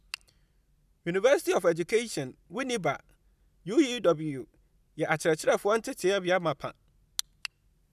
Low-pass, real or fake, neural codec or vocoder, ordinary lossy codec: 14.4 kHz; real; none; none